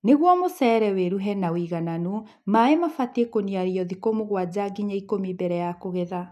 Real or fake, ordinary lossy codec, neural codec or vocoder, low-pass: real; none; none; 14.4 kHz